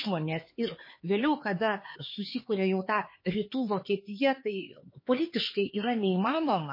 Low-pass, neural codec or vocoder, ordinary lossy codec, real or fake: 5.4 kHz; codec, 16 kHz, 4 kbps, X-Codec, HuBERT features, trained on LibriSpeech; MP3, 24 kbps; fake